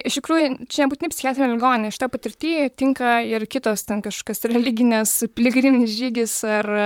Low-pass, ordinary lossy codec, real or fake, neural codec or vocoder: 19.8 kHz; MP3, 96 kbps; fake; vocoder, 44.1 kHz, 128 mel bands, Pupu-Vocoder